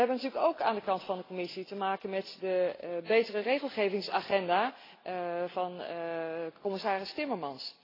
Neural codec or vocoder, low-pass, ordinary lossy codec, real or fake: none; 5.4 kHz; AAC, 24 kbps; real